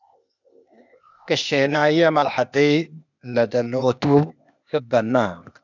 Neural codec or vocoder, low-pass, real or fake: codec, 16 kHz, 0.8 kbps, ZipCodec; 7.2 kHz; fake